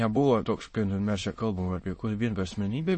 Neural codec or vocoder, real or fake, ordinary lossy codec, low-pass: autoencoder, 22.05 kHz, a latent of 192 numbers a frame, VITS, trained on many speakers; fake; MP3, 32 kbps; 9.9 kHz